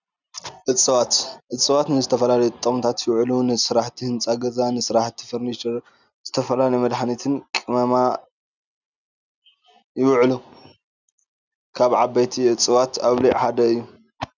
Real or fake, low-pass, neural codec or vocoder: real; 7.2 kHz; none